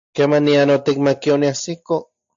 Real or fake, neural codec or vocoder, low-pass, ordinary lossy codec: real; none; 7.2 kHz; AAC, 64 kbps